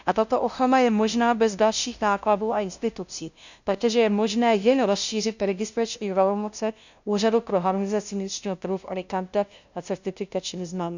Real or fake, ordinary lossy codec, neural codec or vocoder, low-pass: fake; none; codec, 16 kHz, 0.5 kbps, FunCodec, trained on LibriTTS, 25 frames a second; 7.2 kHz